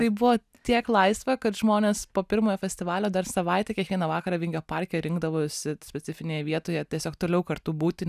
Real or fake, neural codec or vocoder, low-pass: real; none; 14.4 kHz